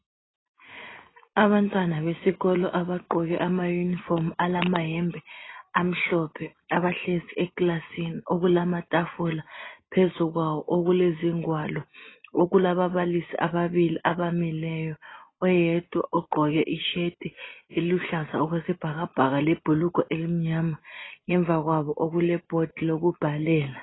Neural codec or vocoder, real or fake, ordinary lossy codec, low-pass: none; real; AAC, 16 kbps; 7.2 kHz